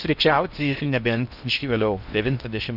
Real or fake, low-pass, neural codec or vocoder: fake; 5.4 kHz; codec, 16 kHz in and 24 kHz out, 0.8 kbps, FocalCodec, streaming, 65536 codes